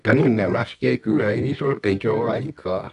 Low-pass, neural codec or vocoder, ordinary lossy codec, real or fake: 10.8 kHz; codec, 24 kHz, 0.9 kbps, WavTokenizer, medium music audio release; none; fake